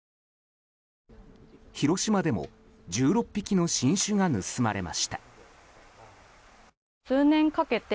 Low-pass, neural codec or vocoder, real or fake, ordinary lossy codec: none; none; real; none